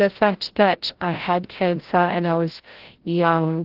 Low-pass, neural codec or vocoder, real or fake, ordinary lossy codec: 5.4 kHz; codec, 16 kHz, 0.5 kbps, FreqCodec, larger model; fake; Opus, 16 kbps